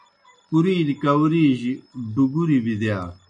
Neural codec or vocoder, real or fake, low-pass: none; real; 9.9 kHz